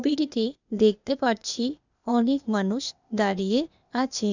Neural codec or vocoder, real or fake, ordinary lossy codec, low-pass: codec, 16 kHz, 0.8 kbps, ZipCodec; fake; none; 7.2 kHz